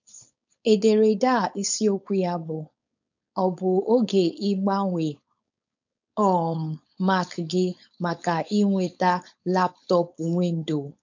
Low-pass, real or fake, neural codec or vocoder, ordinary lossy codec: 7.2 kHz; fake; codec, 16 kHz, 4.8 kbps, FACodec; none